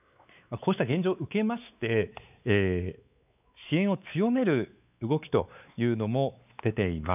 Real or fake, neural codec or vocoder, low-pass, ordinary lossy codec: fake; codec, 16 kHz, 4 kbps, X-Codec, WavLM features, trained on Multilingual LibriSpeech; 3.6 kHz; none